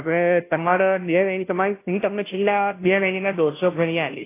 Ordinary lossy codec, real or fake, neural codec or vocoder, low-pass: AAC, 24 kbps; fake; codec, 16 kHz, 0.5 kbps, FunCodec, trained on Chinese and English, 25 frames a second; 3.6 kHz